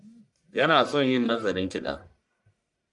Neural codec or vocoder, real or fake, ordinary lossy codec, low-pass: codec, 44.1 kHz, 1.7 kbps, Pupu-Codec; fake; MP3, 96 kbps; 10.8 kHz